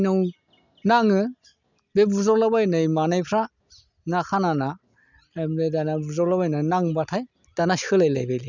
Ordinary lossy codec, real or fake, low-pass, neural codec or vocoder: none; real; 7.2 kHz; none